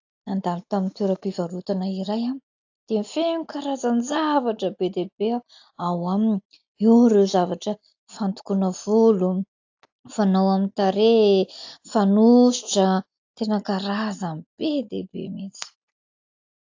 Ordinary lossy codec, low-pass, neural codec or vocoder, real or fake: AAC, 48 kbps; 7.2 kHz; none; real